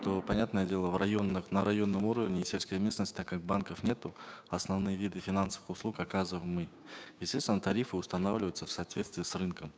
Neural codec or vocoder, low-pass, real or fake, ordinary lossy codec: codec, 16 kHz, 6 kbps, DAC; none; fake; none